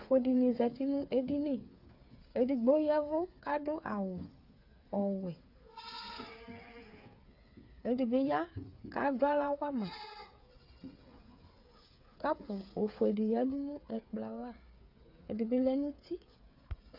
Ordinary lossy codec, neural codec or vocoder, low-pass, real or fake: AAC, 48 kbps; codec, 16 kHz, 8 kbps, FreqCodec, smaller model; 5.4 kHz; fake